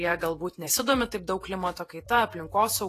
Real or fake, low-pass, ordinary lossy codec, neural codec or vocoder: fake; 14.4 kHz; AAC, 48 kbps; vocoder, 48 kHz, 128 mel bands, Vocos